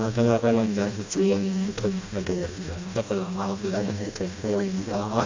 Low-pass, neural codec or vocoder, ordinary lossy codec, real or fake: 7.2 kHz; codec, 16 kHz, 1 kbps, FreqCodec, smaller model; MP3, 48 kbps; fake